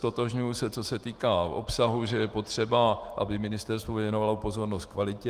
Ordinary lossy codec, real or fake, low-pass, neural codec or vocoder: Opus, 24 kbps; fake; 14.4 kHz; autoencoder, 48 kHz, 128 numbers a frame, DAC-VAE, trained on Japanese speech